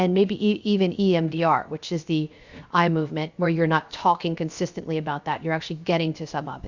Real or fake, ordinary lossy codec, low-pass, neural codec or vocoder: fake; Opus, 64 kbps; 7.2 kHz; codec, 16 kHz, 0.7 kbps, FocalCodec